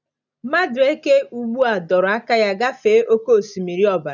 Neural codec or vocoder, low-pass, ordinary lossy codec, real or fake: none; 7.2 kHz; none; real